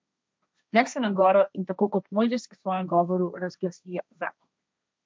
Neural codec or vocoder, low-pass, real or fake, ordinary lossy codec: codec, 16 kHz, 1.1 kbps, Voila-Tokenizer; none; fake; none